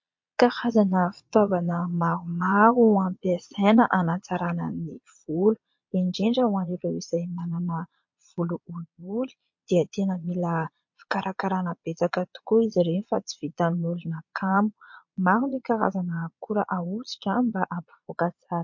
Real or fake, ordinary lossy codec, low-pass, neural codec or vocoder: fake; MP3, 48 kbps; 7.2 kHz; vocoder, 22.05 kHz, 80 mel bands, Vocos